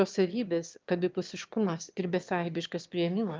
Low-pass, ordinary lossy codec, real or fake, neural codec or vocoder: 7.2 kHz; Opus, 32 kbps; fake; autoencoder, 22.05 kHz, a latent of 192 numbers a frame, VITS, trained on one speaker